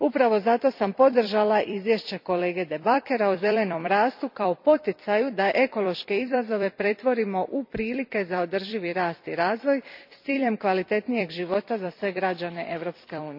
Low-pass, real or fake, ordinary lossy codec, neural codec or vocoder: 5.4 kHz; fake; MP3, 48 kbps; vocoder, 44.1 kHz, 128 mel bands every 256 samples, BigVGAN v2